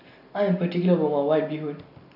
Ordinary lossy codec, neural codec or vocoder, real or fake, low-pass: none; none; real; 5.4 kHz